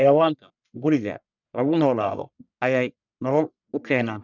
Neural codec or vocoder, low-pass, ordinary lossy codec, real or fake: codec, 44.1 kHz, 1.7 kbps, Pupu-Codec; 7.2 kHz; none; fake